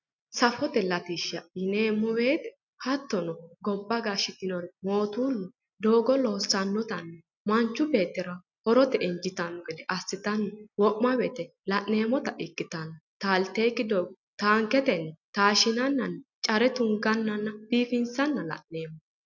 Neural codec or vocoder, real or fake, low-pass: none; real; 7.2 kHz